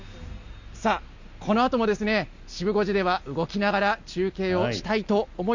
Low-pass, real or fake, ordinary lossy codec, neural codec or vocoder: 7.2 kHz; real; Opus, 64 kbps; none